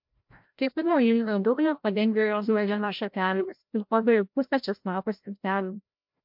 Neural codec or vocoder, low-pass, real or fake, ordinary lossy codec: codec, 16 kHz, 0.5 kbps, FreqCodec, larger model; 5.4 kHz; fake; MP3, 48 kbps